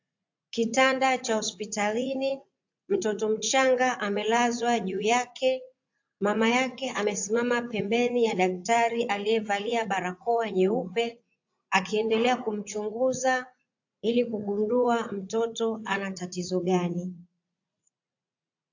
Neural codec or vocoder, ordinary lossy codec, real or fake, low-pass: vocoder, 44.1 kHz, 80 mel bands, Vocos; AAC, 48 kbps; fake; 7.2 kHz